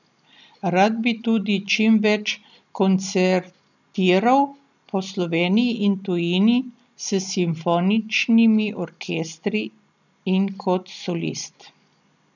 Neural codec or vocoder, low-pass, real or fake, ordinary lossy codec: none; 7.2 kHz; real; none